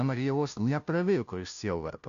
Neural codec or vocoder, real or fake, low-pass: codec, 16 kHz, 0.5 kbps, FunCodec, trained on Chinese and English, 25 frames a second; fake; 7.2 kHz